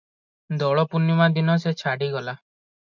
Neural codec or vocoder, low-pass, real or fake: none; 7.2 kHz; real